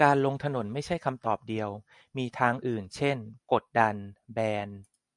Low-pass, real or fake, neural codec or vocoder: 10.8 kHz; real; none